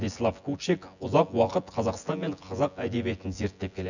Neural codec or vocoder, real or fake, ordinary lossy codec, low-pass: vocoder, 24 kHz, 100 mel bands, Vocos; fake; none; 7.2 kHz